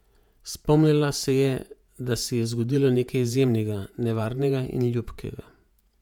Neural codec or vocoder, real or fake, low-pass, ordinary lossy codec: vocoder, 48 kHz, 128 mel bands, Vocos; fake; 19.8 kHz; none